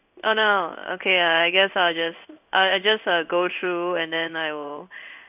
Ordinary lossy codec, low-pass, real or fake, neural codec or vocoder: none; 3.6 kHz; fake; codec, 16 kHz in and 24 kHz out, 1 kbps, XY-Tokenizer